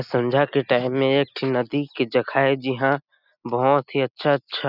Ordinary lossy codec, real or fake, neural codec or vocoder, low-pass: none; real; none; 5.4 kHz